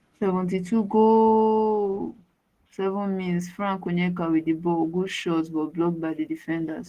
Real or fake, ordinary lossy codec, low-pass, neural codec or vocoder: real; Opus, 16 kbps; 14.4 kHz; none